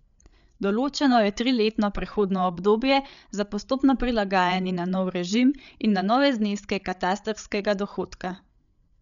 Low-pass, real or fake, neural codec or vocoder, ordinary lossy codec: 7.2 kHz; fake; codec, 16 kHz, 8 kbps, FreqCodec, larger model; none